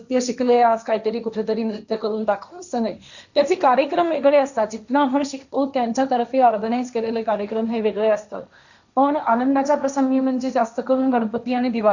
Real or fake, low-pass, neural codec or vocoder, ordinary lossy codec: fake; 7.2 kHz; codec, 16 kHz, 1.1 kbps, Voila-Tokenizer; none